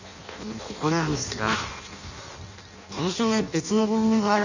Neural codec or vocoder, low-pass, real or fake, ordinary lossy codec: codec, 16 kHz in and 24 kHz out, 0.6 kbps, FireRedTTS-2 codec; 7.2 kHz; fake; none